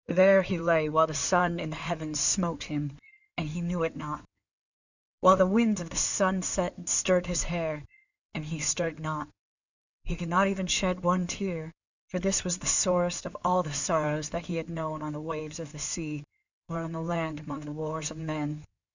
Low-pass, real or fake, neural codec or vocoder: 7.2 kHz; fake; codec, 16 kHz in and 24 kHz out, 2.2 kbps, FireRedTTS-2 codec